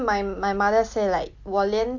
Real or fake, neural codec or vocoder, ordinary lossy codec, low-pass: real; none; none; 7.2 kHz